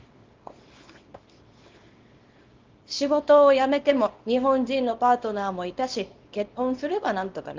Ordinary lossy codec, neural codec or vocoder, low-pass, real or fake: Opus, 32 kbps; codec, 24 kHz, 0.9 kbps, WavTokenizer, small release; 7.2 kHz; fake